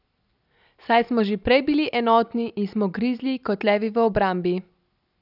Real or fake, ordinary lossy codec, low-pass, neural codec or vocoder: real; none; 5.4 kHz; none